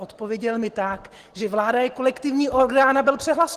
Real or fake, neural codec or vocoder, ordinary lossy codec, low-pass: real; none; Opus, 16 kbps; 14.4 kHz